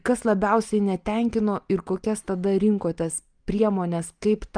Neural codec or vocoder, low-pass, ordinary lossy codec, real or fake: none; 9.9 kHz; Opus, 32 kbps; real